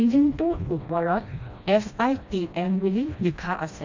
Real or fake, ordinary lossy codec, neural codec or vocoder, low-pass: fake; MP3, 64 kbps; codec, 16 kHz, 1 kbps, FreqCodec, smaller model; 7.2 kHz